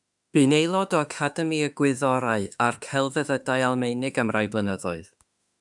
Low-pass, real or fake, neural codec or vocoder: 10.8 kHz; fake; autoencoder, 48 kHz, 32 numbers a frame, DAC-VAE, trained on Japanese speech